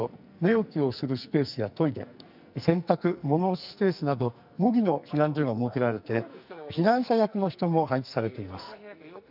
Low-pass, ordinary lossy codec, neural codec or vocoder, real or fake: 5.4 kHz; none; codec, 44.1 kHz, 2.6 kbps, SNAC; fake